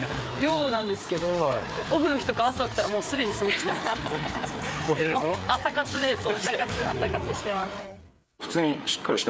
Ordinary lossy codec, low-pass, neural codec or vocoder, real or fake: none; none; codec, 16 kHz, 4 kbps, FreqCodec, larger model; fake